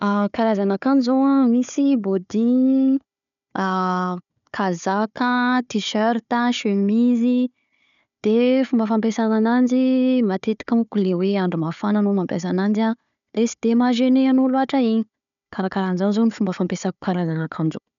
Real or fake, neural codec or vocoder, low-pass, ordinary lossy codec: fake; codec, 16 kHz, 4 kbps, FunCodec, trained on Chinese and English, 50 frames a second; 7.2 kHz; none